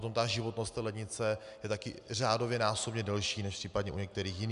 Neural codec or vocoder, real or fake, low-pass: none; real; 10.8 kHz